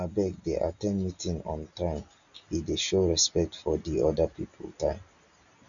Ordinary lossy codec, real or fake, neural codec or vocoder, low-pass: none; real; none; 7.2 kHz